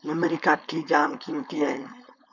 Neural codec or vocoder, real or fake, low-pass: codec, 16 kHz, 4.8 kbps, FACodec; fake; 7.2 kHz